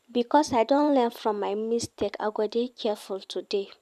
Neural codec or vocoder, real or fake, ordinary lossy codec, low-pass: vocoder, 44.1 kHz, 128 mel bands every 512 samples, BigVGAN v2; fake; none; 14.4 kHz